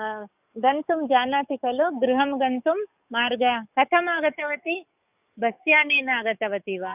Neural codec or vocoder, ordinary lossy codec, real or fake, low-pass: codec, 44.1 kHz, 7.8 kbps, DAC; none; fake; 3.6 kHz